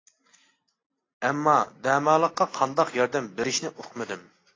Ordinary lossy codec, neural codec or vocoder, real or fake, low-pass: AAC, 32 kbps; none; real; 7.2 kHz